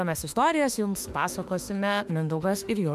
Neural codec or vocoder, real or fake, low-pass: autoencoder, 48 kHz, 32 numbers a frame, DAC-VAE, trained on Japanese speech; fake; 14.4 kHz